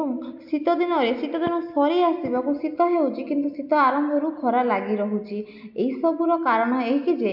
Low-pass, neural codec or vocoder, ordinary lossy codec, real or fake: 5.4 kHz; none; MP3, 48 kbps; real